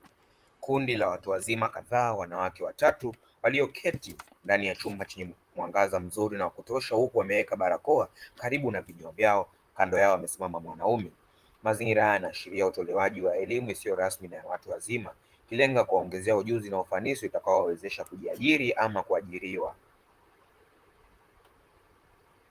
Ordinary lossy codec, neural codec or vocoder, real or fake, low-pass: Opus, 32 kbps; vocoder, 44.1 kHz, 128 mel bands, Pupu-Vocoder; fake; 14.4 kHz